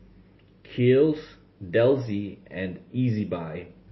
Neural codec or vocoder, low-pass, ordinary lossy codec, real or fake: none; 7.2 kHz; MP3, 24 kbps; real